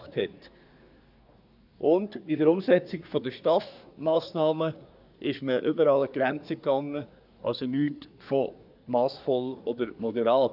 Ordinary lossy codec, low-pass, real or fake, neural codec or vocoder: none; 5.4 kHz; fake; codec, 24 kHz, 1 kbps, SNAC